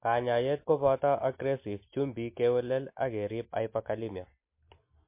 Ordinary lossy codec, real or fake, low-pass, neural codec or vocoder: MP3, 24 kbps; real; 3.6 kHz; none